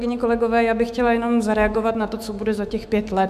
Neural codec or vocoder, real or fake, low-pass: autoencoder, 48 kHz, 128 numbers a frame, DAC-VAE, trained on Japanese speech; fake; 14.4 kHz